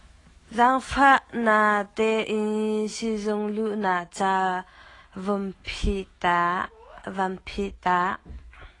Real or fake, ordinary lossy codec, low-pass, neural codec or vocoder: fake; AAC, 32 kbps; 10.8 kHz; autoencoder, 48 kHz, 128 numbers a frame, DAC-VAE, trained on Japanese speech